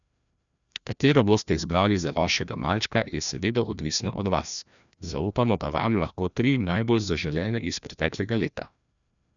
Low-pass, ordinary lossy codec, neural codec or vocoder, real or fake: 7.2 kHz; none; codec, 16 kHz, 1 kbps, FreqCodec, larger model; fake